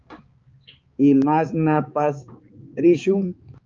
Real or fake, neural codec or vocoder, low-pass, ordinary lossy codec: fake; codec, 16 kHz, 4 kbps, X-Codec, HuBERT features, trained on balanced general audio; 7.2 kHz; Opus, 24 kbps